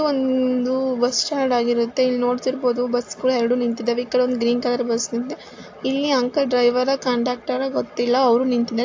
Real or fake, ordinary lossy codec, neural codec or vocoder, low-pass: real; AAC, 48 kbps; none; 7.2 kHz